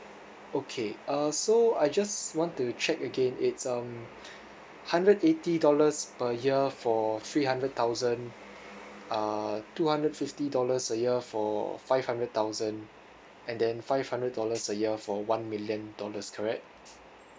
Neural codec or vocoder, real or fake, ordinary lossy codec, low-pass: none; real; none; none